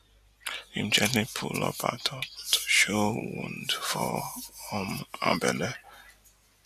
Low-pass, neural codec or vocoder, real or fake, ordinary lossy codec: 14.4 kHz; none; real; none